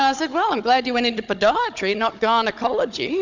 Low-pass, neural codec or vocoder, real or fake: 7.2 kHz; codec, 16 kHz, 16 kbps, FunCodec, trained on Chinese and English, 50 frames a second; fake